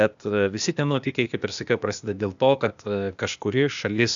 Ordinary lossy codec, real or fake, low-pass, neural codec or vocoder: MP3, 96 kbps; fake; 7.2 kHz; codec, 16 kHz, 0.8 kbps, ZipCodec